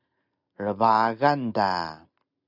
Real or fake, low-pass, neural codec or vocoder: real; 5.4 kHz; none